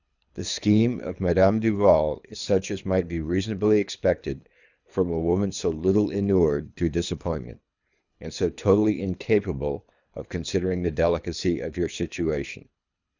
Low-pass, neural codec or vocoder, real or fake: 7.2 kHz; codec, 24 kHz, 3 kbps, HILCodec; fake